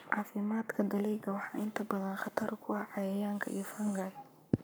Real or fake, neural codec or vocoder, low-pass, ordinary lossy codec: fake; codec, 44.1 kHz, 7.8 kbps, Pupu-Codec; none; none